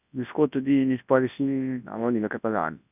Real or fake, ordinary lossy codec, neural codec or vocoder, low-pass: fake; none; codec, 24 kHz, 0.9 kbps, WavTokenizer, large speech release; 3.6 kHz